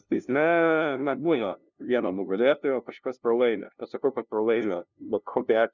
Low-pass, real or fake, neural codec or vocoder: 7.2 kHz; fake; codec, 16 kHz, 0.5 kbps, FunCodec, trained on LibriTTS, 25 frames a second